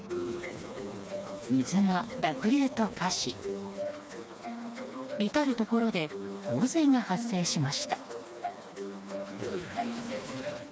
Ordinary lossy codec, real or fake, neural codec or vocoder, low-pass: none; fake; codec, 16 kHz, 2 kbps, FreqCodec, smaller model; none